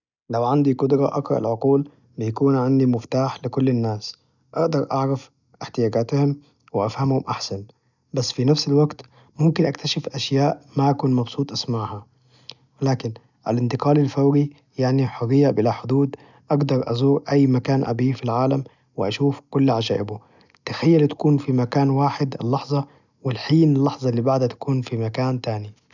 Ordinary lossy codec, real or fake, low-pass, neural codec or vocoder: none; real; 7.2 kHz; none